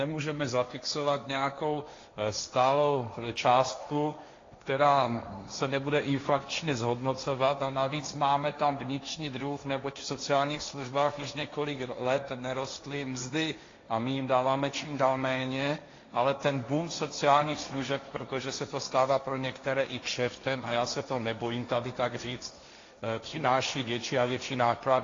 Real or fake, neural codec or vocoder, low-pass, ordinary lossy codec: fake; codec, 16 kHz, 1.1 kbps, Voila-Tokenizer; 7.2 kHz; AAC, 32 kbps